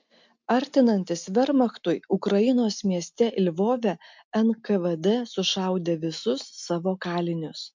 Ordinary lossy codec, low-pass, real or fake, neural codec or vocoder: MP3, 64 kbps; 7.2 kHz; real; none